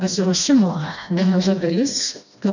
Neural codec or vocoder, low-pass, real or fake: codec, 16 kHz, 1 kbps, FreqCodec, smaller model; 7.2 kHz; fake